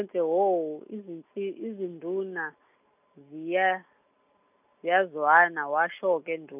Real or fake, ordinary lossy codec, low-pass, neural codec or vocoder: real; none; 3.6 kHz; none